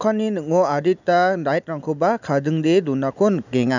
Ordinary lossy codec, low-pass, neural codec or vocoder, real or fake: none; 7.2 kHz; none; real